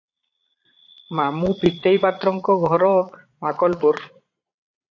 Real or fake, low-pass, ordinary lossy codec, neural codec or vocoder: fake; 7.2 kHz; AAC, 48 kbps; vocoder, 22.05 kHz, 80 mel bands, Vocos